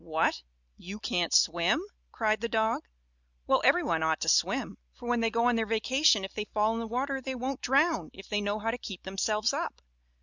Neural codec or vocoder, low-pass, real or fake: none; 7.2 kHz; real